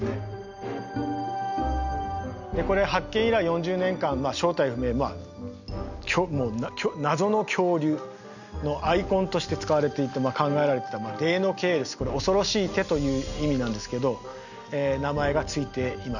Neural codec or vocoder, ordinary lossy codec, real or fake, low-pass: none; none; real; 7.2 kHz